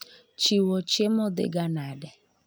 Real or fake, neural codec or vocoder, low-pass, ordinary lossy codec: real; none; none; none